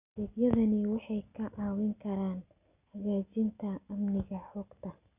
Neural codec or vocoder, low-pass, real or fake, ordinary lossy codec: none; 3.6 kHz; real; none